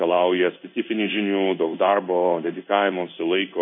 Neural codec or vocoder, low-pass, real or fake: codec, 16 kHz in and 24 kHz out, 1 kbps, XY-Tokenizer; 7.2 kHz; fake